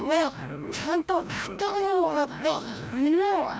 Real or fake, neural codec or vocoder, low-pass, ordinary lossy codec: fake; codec, 16 kHz, 0.5 kbps, FreqCodec, larger model; none; none